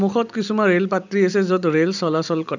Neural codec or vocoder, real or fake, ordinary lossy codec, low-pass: none; real; none; 7.2 kHz